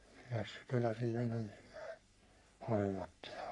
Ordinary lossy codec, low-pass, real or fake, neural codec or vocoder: none; 10.8 kHz; fake; codec, 44.1 kHz, 3.4 kbps, Pupu-Codec